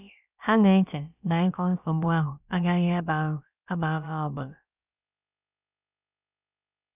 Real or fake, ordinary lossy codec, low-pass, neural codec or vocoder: fake; none; 3.6 kHz; codec, 16 kHz, about 1 kbps, DyCAST, with the encoder's durations